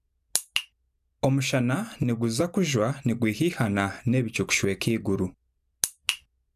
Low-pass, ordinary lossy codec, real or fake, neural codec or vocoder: 14.4 kHz; none; real; none